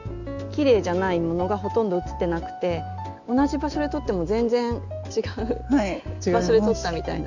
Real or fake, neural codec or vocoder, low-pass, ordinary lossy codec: real; none; 7.2 kHz; none